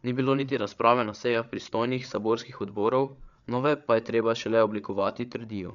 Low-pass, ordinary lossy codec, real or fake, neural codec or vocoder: 7.2 kHz; none; fake; codec, 16 kHz, 8 kbps, FreqCodec, larger model